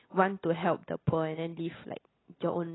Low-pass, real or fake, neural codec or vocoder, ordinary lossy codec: 7.2 kHz; real; none; AAC, 16 kbps